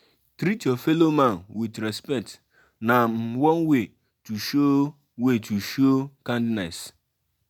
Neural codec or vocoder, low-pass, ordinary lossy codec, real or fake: none; 19.8 kHz; none; real